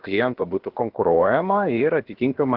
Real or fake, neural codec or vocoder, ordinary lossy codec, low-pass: fake; codec, 16 kHz, about 1 kbps, DyCAST, with the encoder's durations; Opus, 16 kbps; 5.4 kHz